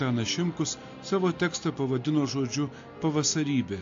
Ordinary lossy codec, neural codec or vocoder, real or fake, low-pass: AAC, 48 kbps; none; real; 7.2 kHz